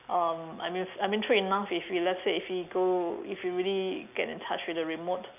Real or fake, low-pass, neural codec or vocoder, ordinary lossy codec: real; 3.6 kHz; none; none